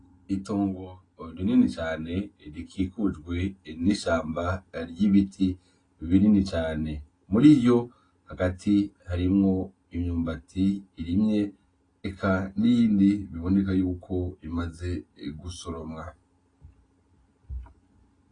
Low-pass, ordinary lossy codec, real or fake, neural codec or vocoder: 9.9 kHz; AAC, 32 kbps; real; none